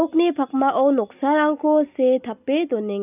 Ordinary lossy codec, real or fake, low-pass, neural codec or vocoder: none; real; 3.6 kHz; none